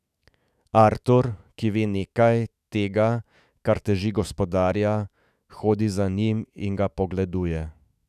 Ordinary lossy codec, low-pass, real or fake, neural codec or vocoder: none; 14.4 kHz; fake; autoencoder, 48 kHz, 128 numbers a frame, DAC-VAE, trained on Japanese speech